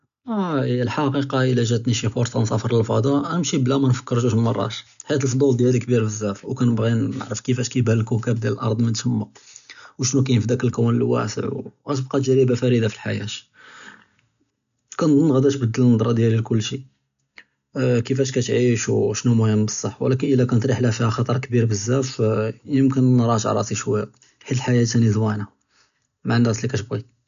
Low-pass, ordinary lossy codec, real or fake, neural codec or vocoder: 7.2 kHz; none; real; none